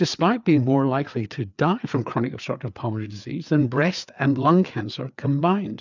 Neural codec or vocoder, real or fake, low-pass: codec, 16 kHz, 4 kbps, FreqCodec, larger model; fake; 7.2 kHz